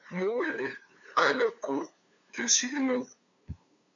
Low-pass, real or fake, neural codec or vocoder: 7.2 kHz; fake; codec, 16 kHz, 2 kbps, FunCodec, trained on LibriTTS, 25 frames a second